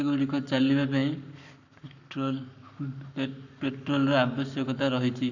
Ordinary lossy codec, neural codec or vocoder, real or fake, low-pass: none; codec, 44.1 kHz, 7.8 kbps, Pupu-Codec; fake; 7.2 kHz